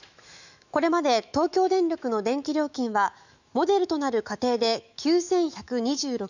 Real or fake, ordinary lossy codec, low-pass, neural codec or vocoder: fake; none; 7.2 kHz; autoencoder, 48 kHz, 128 numbers a frame, DAC-VAE, trained on Japanese speech